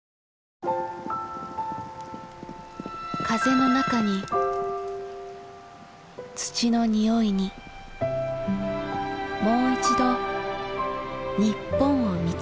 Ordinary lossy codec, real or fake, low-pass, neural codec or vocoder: none; real; none; none